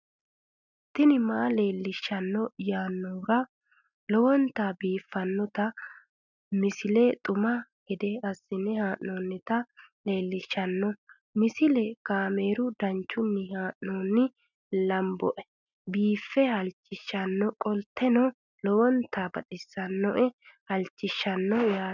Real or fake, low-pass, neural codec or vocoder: real; 7.2 kHz; none